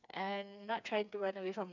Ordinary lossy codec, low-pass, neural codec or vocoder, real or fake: none; 7.2 kHz; codec, 16 kHz, 4 kbps, FreqCodec, smaller model; fake